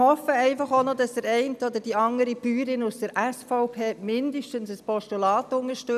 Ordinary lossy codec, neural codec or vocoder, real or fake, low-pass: none; none; real; 14.4 kHz